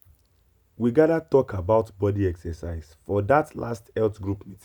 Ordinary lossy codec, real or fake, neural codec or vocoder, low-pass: none; real; none; 19.8 kHz